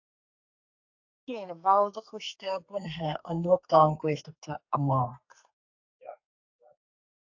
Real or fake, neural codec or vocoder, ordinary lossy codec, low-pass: fake; codec, 32 kHz, 1.9 kbps, SNAC; AAC, 48 kbps; 7.2 kHz